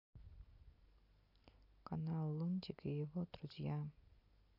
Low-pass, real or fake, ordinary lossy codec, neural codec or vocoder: 5.4 kHz; real; AAC, 48 kbps; none